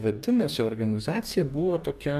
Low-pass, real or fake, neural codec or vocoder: 14.4 kHz; fake; codec, 44.1 kHz, 2.6 kbps, DAC